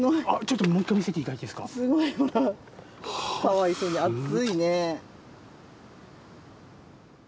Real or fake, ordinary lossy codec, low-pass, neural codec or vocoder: real; none; none; none